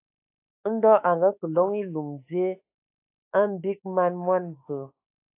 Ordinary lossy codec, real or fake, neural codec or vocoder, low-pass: AAC, 24 kbps; fake; autoencoder, 48 kHz, 32 numbers a frame, DAC-VAE, trained on Japanese speech; 3.6 kHz